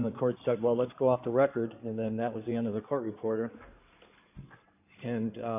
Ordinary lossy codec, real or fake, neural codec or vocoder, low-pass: AAC, 32 kbps; fake; codec, 16 kHz in and 24 kHz out, 2.2 kbps, FireRedTTS-2 codec; 3.6 kHz